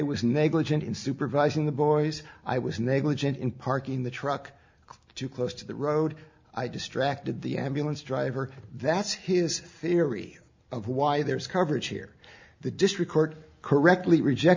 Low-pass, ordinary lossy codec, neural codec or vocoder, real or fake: 7.2 kHz; MP3, 64 kbps; none; real